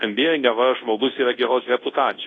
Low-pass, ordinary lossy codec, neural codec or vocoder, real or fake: 10.8 kHz; AAC, 32 kbps; codec, 24 kHz, 0.9 kbps, WavTokenizer, large speech release; fake